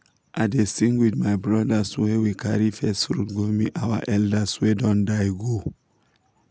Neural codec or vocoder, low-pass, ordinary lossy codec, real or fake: none; none; none; real